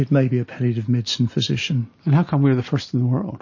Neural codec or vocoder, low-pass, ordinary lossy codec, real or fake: none; 7.2 kHz; MP3, 32 kbps; real